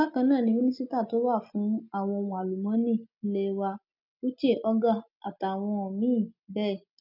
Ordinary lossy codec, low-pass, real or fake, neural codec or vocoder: none; 5.4 kHz; real; none